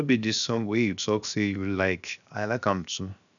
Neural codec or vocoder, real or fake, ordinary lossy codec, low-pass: codec, 16 kHz, 0.7 kbps, FocalCodec; fake; none; 7.2 kHz